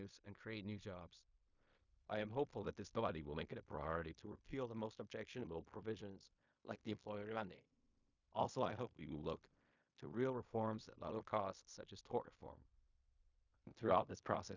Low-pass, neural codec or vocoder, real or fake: 7.2 kHz; codec, 16 kHz in and 24 kHz out, 0.4 kbps, LongCat-Audio-Codec, fine tuned four codebook decoder; fake